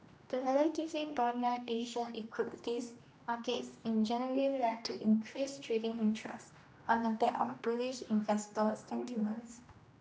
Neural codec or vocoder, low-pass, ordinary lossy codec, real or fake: codec, 16 kHz, 1 kbps, X-Codec, HuBERT features, trained on general audio; none; none; fake